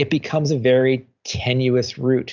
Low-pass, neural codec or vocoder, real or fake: 7.2 kHz; none; real